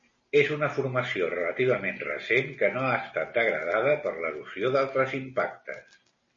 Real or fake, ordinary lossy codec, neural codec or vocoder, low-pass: real; MP3, 32 kbps; none; 7.2 kHz